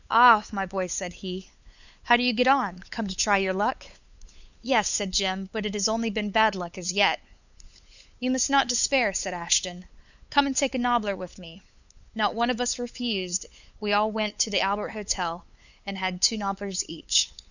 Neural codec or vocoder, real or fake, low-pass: codec, 16 kHz, 8 kbps, FunCodec, trained on LibriTTS, 25 frames a second; fake; 7.2 kHz